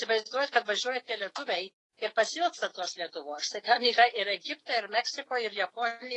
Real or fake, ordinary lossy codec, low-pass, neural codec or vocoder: fake; AAC, 32 kbps; 10.8 kHz; autoencoder, 48 kHz, 128 numbers a frame, DAC-VAE, trained on Japanese speech